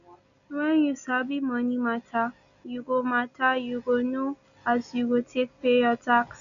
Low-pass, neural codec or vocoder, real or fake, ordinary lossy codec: 7.2 kHz; none; real; none